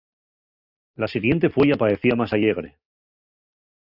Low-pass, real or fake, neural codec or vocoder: 5.4 kHz; real; none